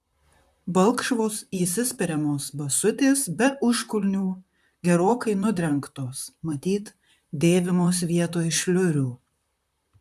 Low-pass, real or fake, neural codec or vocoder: 14.4 kHz; fake; vocoder, 44.1 kHz, 128 mel bands, Pupu-Vocoder